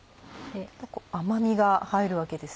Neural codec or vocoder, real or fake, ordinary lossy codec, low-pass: none; real; none; none